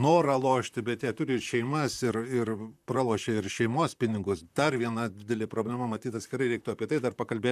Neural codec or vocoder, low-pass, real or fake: vocoder, 44.1 kHz, 128 mel bands, Pupu-Vocoder; 14.4 kHz; fake